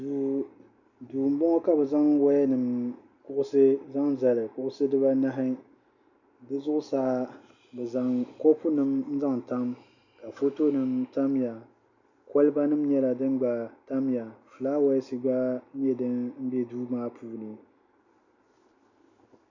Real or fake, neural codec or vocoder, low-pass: real; none; 7.2 kHz